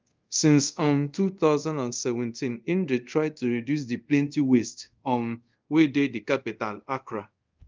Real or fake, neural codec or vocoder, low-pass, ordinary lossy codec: fake; codec, 24 kHz, 0.5 kbps, DualCodec; 7.2 kHz; Opus, 24 kbps